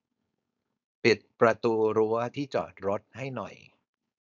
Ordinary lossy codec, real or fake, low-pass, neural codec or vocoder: none; fake; 7.2 kHz; codec, 16 kHz, 4.8 kbps, FACodec